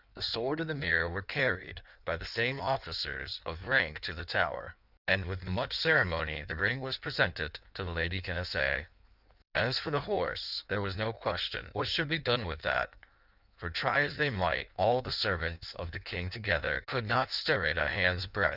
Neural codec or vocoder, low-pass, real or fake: codec, 16 kHz in and 24 kHz out, 1.1 kbps, FireRedTTS-2 codec; 5.4 kHz; fake